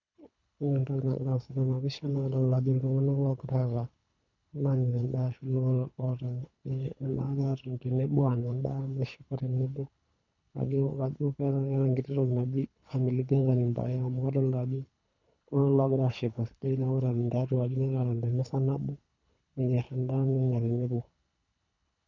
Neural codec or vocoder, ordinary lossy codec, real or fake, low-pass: codec, 24 kHz, 3 kbps, HILCodec; none; fake; 7.2 kHz